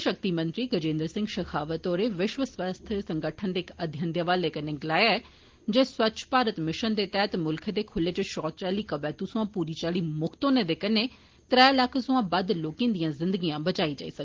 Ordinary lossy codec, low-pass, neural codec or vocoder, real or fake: Opus, 16 kbps; 7.2 kHz; none; real